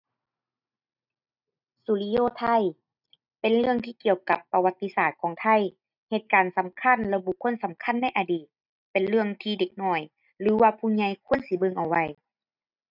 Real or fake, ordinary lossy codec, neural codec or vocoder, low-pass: real; none; none; 5.4 kHz